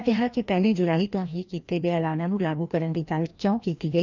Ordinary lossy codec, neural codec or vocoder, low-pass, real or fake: none; codec, 16 kHz, 1 kbps, FreqCodec, larger model; 7.2 kHz; fake